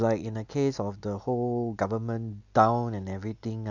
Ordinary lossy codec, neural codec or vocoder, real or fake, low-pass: none; none; real; 7.2 kHz